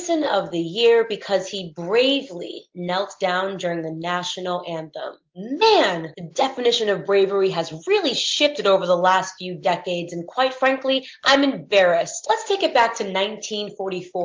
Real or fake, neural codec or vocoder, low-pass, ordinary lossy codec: real; none; 7.2 kHz; Opus, 32 kbps